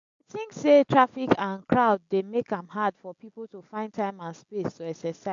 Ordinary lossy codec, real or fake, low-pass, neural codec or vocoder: AAC, 64 kbps; real; 7.2 kHz; none